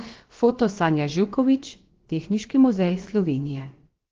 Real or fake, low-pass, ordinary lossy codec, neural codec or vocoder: fake; 7.2 kHz; Opus, 16 kbps; codec, 16 kHz, about 1 kbps, DyCAST, with the encoder's durations